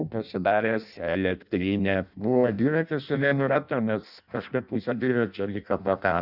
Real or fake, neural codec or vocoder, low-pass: fake; codec, 16 kHz in and 24 kHz out, 0.6 kbps, FireRedTTS-2 codec; 5.4 kHz